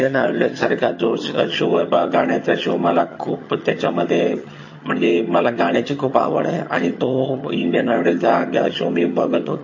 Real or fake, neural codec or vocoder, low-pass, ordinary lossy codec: fake; vocoder, 22.05 kHz, 80 mel bands, HiFi-GAN; 7.2 kHz; MP3, 32 kbps